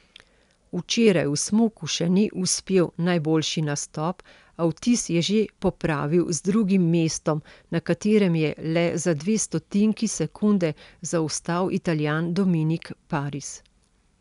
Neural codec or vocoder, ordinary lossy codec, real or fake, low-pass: none; none; real; 10.8 kHz